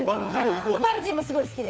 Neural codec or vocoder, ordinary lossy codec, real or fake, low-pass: codec, 16 kHz, 4 kbps, FunCodec, trained on LibriTTS, 50 frames a second; none; fake; none